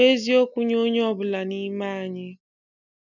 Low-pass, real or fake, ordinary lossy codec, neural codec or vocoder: 7.2 kHz; real; none; none